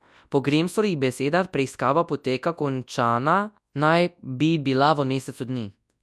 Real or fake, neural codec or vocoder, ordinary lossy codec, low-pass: fake; codec, 24 kHz, 0.9 kbps, WavTokenizer, large speech release; none; none